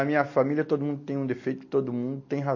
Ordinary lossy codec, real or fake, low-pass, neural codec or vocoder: MP3, 32 kbps; real; 7.2 kHz; none